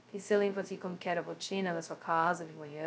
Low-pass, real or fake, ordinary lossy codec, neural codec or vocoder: none; fake; none; codec, 16 kHz, 0.2 kbps, FocalCodec